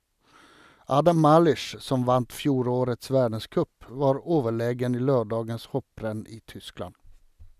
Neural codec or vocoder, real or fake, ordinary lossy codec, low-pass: none; real; none; 14.4 kHz